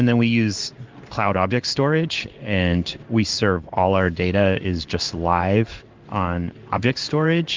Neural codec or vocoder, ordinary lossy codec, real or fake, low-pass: codec, 16 kHz in and 24 kHz out, 1 kbps, XY-Tokenizer; Opus, 32 kbps; fake; 7.2 kHz